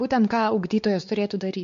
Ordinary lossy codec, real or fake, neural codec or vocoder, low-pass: MP3, 48 kbps; fake; codec, 16 kHz, 4 kbps, X-Codec, WavLM features, trained on Multilingual LibriSpeech; 7.2 kHz